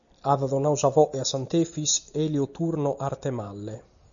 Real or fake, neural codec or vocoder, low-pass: real; none; 7.2 kHz